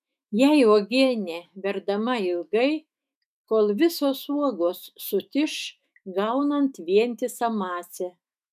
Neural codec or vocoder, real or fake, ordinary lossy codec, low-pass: autoencoder, 48 kHz, 128 numbers a frame, DAC-VAE, trained on Japanese speech; fake; MP3, 96 kbps; 14.4 kHz